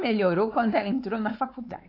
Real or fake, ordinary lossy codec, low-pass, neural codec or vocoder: fake; AAC, 32 kbps; 5.4 kHz; codec, 16 kHz, 4 kbps, X-Codec, WavLM features, trained on Multilingual LibriSpeech